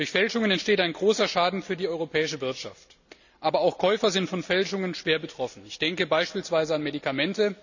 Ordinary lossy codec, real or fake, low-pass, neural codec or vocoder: none; real; 7.2 kHz; none